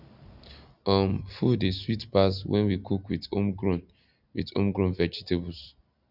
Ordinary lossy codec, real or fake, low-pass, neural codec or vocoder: none; real; 5.4 kHz; none